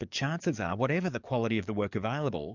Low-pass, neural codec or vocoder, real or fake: 7.2 kHz; codec, 44.1 kHz, 7.8 kbps, DAC; fake